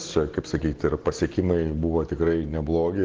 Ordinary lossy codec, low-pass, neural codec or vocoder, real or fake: Opus, 16 kbps; 7.2 kHz; none; real